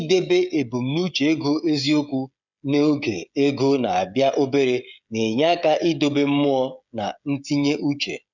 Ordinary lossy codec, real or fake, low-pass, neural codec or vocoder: none; fake; 7.2 kHz; codec, 16 kHz, 16 kbps, FreqCodec, smaller model